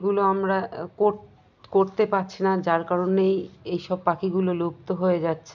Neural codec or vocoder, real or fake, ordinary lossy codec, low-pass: none; real; none; 7.2 kHz